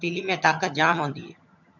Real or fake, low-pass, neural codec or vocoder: fake; 7.2 kHz; vocoder, 22.05 kHz, 80 mel bands, HiFi-GAN